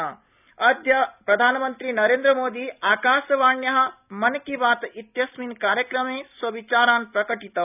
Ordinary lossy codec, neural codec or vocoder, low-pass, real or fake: none; none; 3.6 kHz; real